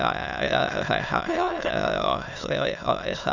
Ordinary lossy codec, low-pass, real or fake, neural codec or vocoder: none; 7.2 kHz; fake; autoencoder, 22.05 kHz, a latent of 192 numbers a frame, VITS, trained on many speakers